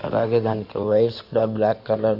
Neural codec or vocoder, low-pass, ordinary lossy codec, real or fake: codec, 16 kHz in and 24 kHz out, 2.2 kbps, FireRedTTS-2 codec; 5.4 kHz; none; fake